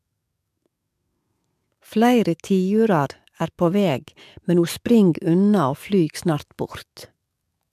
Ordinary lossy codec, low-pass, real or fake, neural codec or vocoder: MP3, 96 kbps; 14.4 kHz; fake; codec, 44.1 kHz, 7.8 kbps, DAC